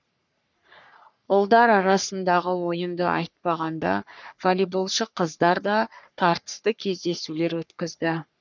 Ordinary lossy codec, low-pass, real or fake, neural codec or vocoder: none; 7.2 kHz; fake; codec, 44.1 kHz, 3.4 kbps, Pupu-Codec